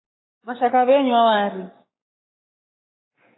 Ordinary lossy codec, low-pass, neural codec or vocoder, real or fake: AAC, 16 kbps; 7.2 kHz; codec, 44.1 kHz, 7.8 kbps, Pupu-Codec; fake